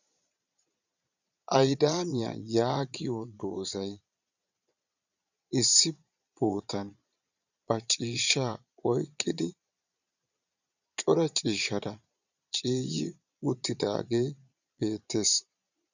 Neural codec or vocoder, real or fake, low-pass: vocoder, 22.05 kHz, 80 mel bands, Vocos; fake; 7.2 kHz